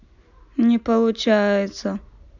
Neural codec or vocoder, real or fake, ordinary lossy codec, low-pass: none; real; none; 7.2 kHz